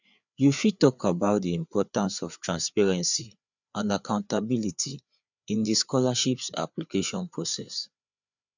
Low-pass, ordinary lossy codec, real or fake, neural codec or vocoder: 7.2 kHz; none; fake; codec, 16 kHz, 4 kbps, FreqCodec, larger model